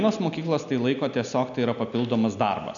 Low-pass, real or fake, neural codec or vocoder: 7.2 kHz; real; none